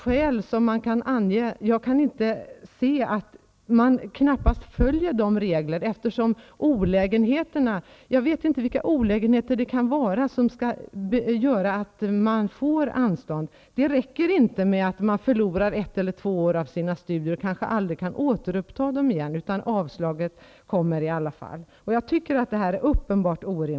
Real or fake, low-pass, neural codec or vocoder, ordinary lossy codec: real; none; none; none